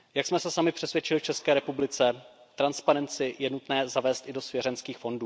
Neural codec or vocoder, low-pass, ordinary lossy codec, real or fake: none; none; none; real